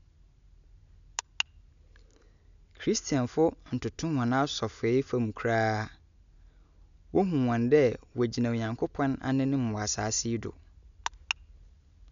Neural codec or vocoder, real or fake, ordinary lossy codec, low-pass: none; real; none; 7.2 kHz